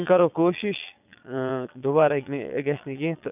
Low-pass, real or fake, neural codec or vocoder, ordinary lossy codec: 3.6 kHz; fake; vocoder, 22.05 kHz, 80 mel bands, Vocos; none